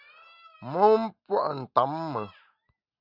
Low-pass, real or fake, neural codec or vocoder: 5.4 kHz; real; none